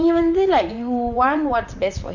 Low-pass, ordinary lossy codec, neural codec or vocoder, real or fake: 7.2 kHz; none; vocoder, 44.1 kHz, 128 mel bands, Pupu-Vocoder; fake